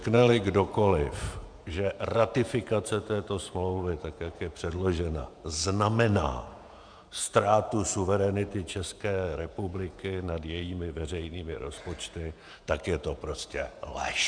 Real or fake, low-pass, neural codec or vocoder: fake; 9.9 kHz; vocoder, 48 kHz, 128 mel bands, Vocos